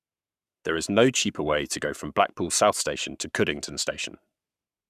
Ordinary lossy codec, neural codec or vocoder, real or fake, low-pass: none; codec, 44.1 kHz, 7.8 kbps, Pupu-Codec; fake; 14.4 kHz